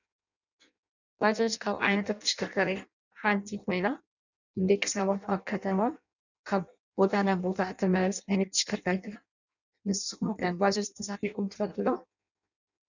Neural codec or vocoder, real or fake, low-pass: codec, 16 kHz in and 24 kHz out, 0.6 kbps, FireRedTTS-2 codec; fake; 7.2 kHz